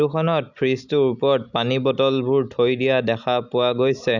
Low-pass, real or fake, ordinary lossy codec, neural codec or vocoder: 7.2 kHz; real; none; none